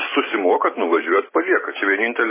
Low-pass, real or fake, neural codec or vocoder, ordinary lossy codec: 3.6 kHz; real; none; MP3, 16 kbps